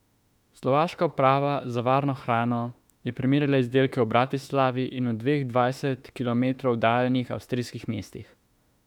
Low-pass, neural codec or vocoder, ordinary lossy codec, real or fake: 19.8 kHz; autoencoder, 48 kHz, 32 numbers a frame, DAC-VAE, trained on Japanese speech; none; fake